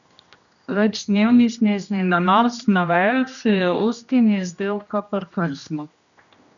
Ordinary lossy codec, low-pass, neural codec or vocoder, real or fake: none; 7.2 kHz; codec, 16 kHz, 1 kbps, X-Codec, HuBERT features, trained on general audio; fake